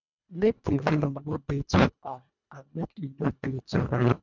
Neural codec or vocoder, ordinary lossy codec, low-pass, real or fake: codec, 24 kHz, 1.5 kbps, HILCodec; none; 7.2 kHz; fake